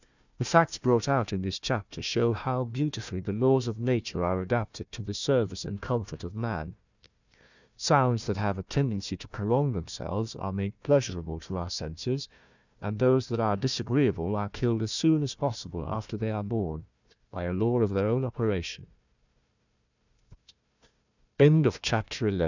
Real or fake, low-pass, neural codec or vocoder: fake; 7.2 kHz; codec, 16 kHz, 1 kbps, FunCodec, trained on Chinese and English, 50 frames a second